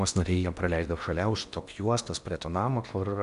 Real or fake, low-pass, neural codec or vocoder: fake; 10.8 kHz; codec, 16 kHz in and 24 kHz out, 0.8 kbps, FocalCodec, streaming, 65536 codes